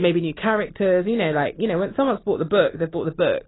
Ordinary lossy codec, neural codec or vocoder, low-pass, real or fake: AAC, 16 kbps; none; 7.2 kHz; real